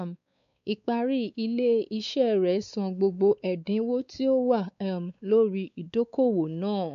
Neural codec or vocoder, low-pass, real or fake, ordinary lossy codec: codec, 16 kHz, 4 kbps, X-Codec, WavLM features, trained on Multilingual LibriSpeech; 7.2 kHz; fake; none